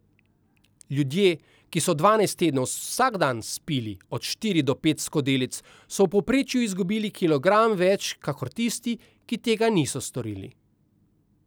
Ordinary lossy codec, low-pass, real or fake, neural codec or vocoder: none; none; real; none